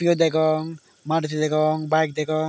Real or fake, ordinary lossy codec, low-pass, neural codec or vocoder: real; none; none; none